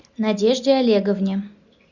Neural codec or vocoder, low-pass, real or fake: none; 7.2 kHz; real